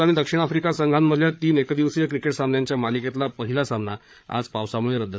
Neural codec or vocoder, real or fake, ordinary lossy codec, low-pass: codec, 16 kHz, 8 kbps, FreqCodec, larger model; fake; none; none